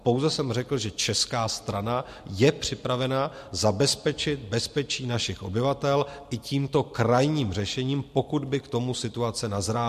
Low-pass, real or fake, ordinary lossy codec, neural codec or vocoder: 14.4 kHz; real; MP3, 64 kbps; none